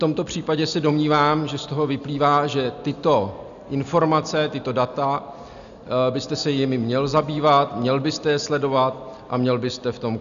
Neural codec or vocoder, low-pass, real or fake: none; 7.2 kHz; real